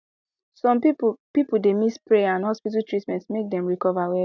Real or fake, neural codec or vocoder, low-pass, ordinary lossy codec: real; none; 7.2 kHz; none